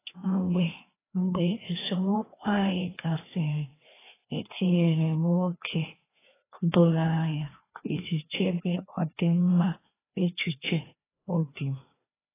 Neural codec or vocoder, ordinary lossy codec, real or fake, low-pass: codec, 16 kHz, 2 kbps, FreqCodec, larger model; AAC, 16 kbps; fake; 3.6 kHz